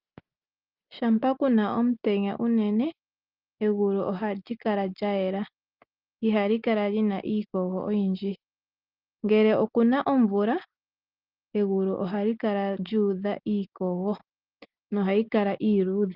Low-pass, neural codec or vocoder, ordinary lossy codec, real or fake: 5.4 kHz; none; Opus, 24 kbps; real